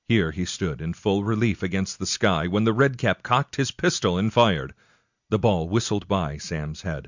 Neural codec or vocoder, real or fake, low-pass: none; real; 7.2 kHz